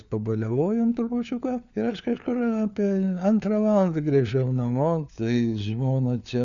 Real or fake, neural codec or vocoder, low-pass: fake; codec, 16 kHz, 4 kbps, FunCodec, trained on LibriTTS, 50 frames a second; 7.2 kHz